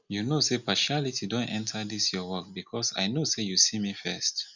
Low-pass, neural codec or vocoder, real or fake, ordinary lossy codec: 7.2 kHz; none; real; none